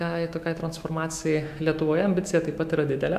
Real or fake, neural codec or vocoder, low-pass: fake; autoencoder, 48 kHz, 128 numbers a frame, DAC-VAE, trained on Japanese speech; 14.4 kHz